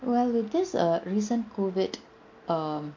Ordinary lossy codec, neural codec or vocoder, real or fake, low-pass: MP3, 48 kbps; none; real; 7.2 kHz